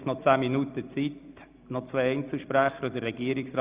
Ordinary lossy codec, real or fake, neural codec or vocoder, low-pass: Opus, 64 kbps; real; none; 3.6 kHz